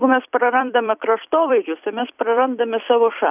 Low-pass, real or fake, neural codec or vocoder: 3.6 kHz; fake; vocoder, 44.1 kHz, 128 mel bands every 256 samples, BigVGAN v2